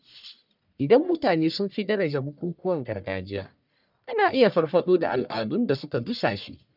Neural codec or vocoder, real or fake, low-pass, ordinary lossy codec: codec, 44.1 kHz, 1.7 kbps, Pupu-Codec; fake; 5.4 kHz; none